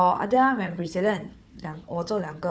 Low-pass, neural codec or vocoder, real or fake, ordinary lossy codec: none; codec, 16 kHz, 4 kbps, FunCodec, trained on Chinese and English, 50 frames a second; fake; none